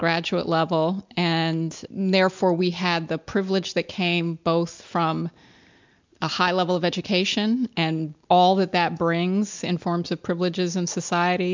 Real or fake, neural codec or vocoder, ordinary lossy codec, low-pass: real; none; MP3, 64 kbps; 7.2 kHz